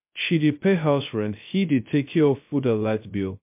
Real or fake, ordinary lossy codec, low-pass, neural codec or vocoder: fake; MP3, 32 kbps; 3.6 kHz; codec, 16 kHz, 0.2 kbps, FocalCodec